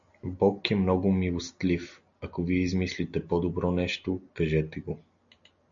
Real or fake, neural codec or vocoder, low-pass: real; none; 7.2 kHz